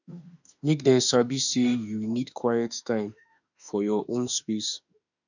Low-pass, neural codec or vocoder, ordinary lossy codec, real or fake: 7.2 kHz; autoencoder, 48 kHz, 32 numbers a frame, DAC-VAE, trained on Japanese speech; none; fake